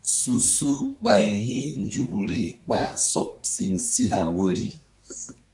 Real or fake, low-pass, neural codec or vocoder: fake; 10.8 kHz; codec, 24 kHz, 1 kbps, SNAC